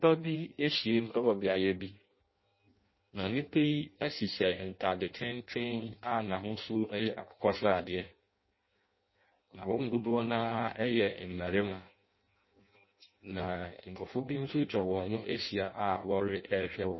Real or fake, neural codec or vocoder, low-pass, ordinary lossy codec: fake; codec, 16 kHz in and 24 kHz out, 0.6 kbps, FireRedTTS-2 codec; 7.2 kHz; MP3, 24 kbps